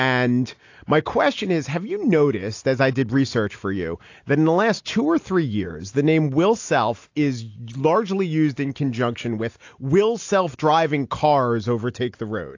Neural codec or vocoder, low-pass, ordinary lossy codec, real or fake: none; 7.2 kHz; AAC, 48 kbps; real